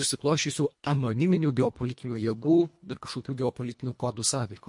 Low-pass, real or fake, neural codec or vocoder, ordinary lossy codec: 10.8 kHz; fake; codec, 24 kHz, 1.5 kbps, HILCodec; MP3, 48 kbps